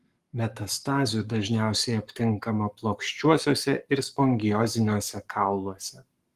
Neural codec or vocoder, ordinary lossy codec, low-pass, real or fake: codec, 44.1 kHz, 7.8 kbps, Pupu-Codec; Opus, 24 kbps; 14.4 kHz; fake